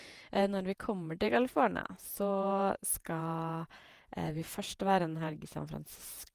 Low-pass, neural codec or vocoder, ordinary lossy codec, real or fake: 14.4 kHz; vocoder, 48 kHz, 128 mel bands, Vocos; Opus, 32 kbps; fake